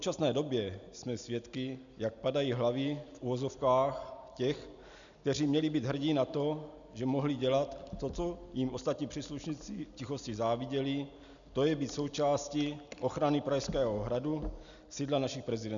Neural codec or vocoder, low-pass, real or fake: none; 7.2 kHz; real